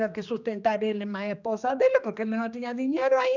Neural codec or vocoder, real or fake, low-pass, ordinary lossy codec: codec, 16 kHz, 2 kbps, X-Codec, HuBERT features, trained on general audio; fake; 7.2 kHz; none